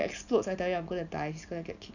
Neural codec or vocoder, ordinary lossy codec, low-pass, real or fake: none; none; 7.2 kHz; real